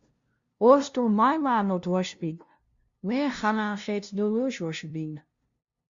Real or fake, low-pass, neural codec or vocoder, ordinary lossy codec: fake; 7.2 kHz; codec, 16 kHz, 0.5 kbps, FunCodec, trained on LibriTTS, 25 frames a second; Opus, 64 kbps